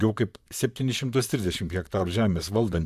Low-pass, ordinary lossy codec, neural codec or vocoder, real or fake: 14.4 kHz; AAC, 96 kbps; vocoder, 44.1 kHz, 128 mel bands, Pupu-Vocoder; fake